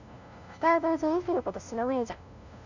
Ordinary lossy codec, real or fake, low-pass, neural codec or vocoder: none; fake; 7.2 kHz; codec, 16 kHz, 0.5 kbps, FunCodec, trained on LibriTTS, 25 frames a second